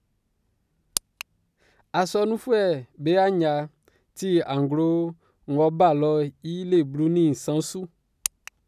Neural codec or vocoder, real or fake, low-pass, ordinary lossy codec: none; real; 14.4 kHz; none